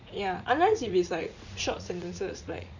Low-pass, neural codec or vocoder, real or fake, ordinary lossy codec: 7.2 kHz; vocoder, 44.1 kHz, 80 mel bands, Vocos; fake; none